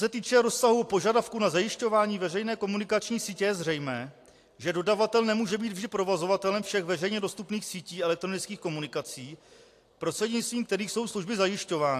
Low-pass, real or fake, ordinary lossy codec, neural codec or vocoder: 14.4 kHz; real; AAC, 64 kbps; none